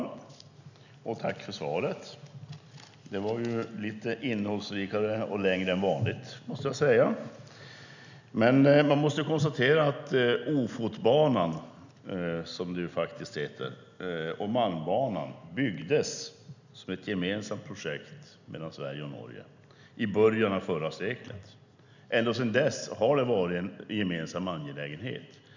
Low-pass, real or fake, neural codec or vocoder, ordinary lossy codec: 7.2 kHz; real; none; none